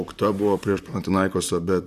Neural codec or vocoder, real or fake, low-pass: vocoder, 44.1 kHz, 128 mel bands, Pupu-Vocoder; fake; 14.4 kHz